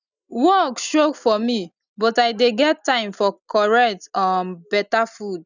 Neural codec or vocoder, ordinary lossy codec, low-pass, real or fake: none; none; 7.2 kHz; real